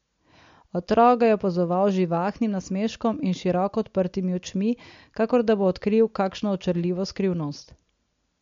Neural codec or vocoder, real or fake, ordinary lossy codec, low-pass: none; real; MP3, 48 kbps; 7.2 kHz